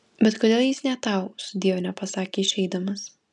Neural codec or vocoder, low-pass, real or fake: none; 10.8 kHz; real